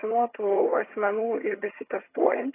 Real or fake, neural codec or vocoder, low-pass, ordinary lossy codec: fake; vocoder, 22.05 kHz, 80 mel bands, HiFi-GAN; 3.6 kHz; AAC, 24 kbps